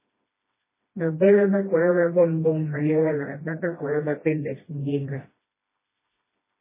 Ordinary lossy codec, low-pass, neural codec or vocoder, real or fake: MP3, 16 kbps; 3.6 kHz; codec, 16 kHz, 1 kbps, FreqCodec, smaller model; fake